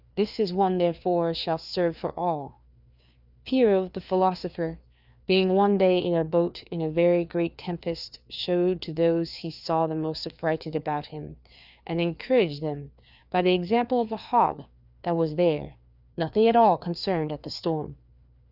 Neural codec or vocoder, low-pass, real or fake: codec, 16 kHz, 2 kbps, FreqCodec, larger model; 5.4 kHz; fake